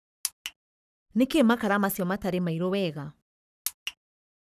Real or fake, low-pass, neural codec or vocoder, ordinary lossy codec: fake; 14.4 kHz; codec, 44.1 kHz, 7.8 kbps, Pupu-Codec; none